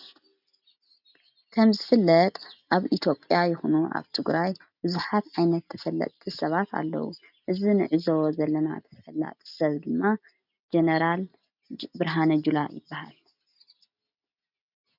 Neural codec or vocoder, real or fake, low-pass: none; real; 5.4 kHz